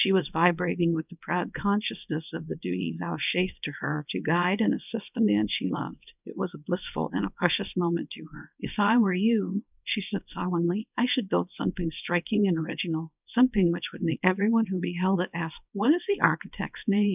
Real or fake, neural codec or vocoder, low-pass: fake; codec, 24 kHz, 0.9 kbps, WavTokenizer, small release; 3.6 kHz